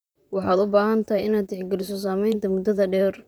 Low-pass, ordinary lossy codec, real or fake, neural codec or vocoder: none; none; fake; vocoder, 44.1 kHz, 128 mel bands, Pupu-Vocoder